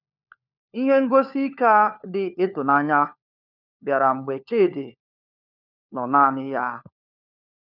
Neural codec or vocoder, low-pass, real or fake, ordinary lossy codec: codec, 16 kHz, 4 kbps, FunCodec, trained on LibriTTS, 50 frames a second; 5.4 kHz; fake; none